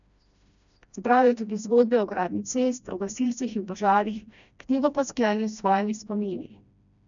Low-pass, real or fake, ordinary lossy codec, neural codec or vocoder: 7.2 kHz; fake; MP3, 96 kbps; codec, 16 kHz, 1 kbps, FreqCodec, smaller model